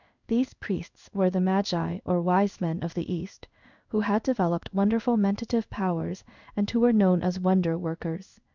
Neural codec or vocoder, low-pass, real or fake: codec, 16 kHz in and 24 kHz out, 1 kbps, XY-Tokenizer; 7.2 kHz; fake